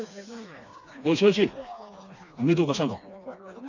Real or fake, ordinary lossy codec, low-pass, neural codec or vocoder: fake; none; 7.2 kHz; codec, 16 kHz, 2 kbps, FreqCodec, smaller model